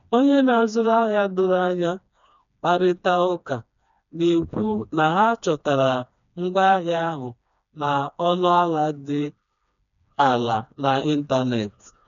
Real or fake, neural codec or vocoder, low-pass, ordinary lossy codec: fake; codec, 16 kHz, 2 kbps, FreqCodec, smaller model; 7.2 kHz; none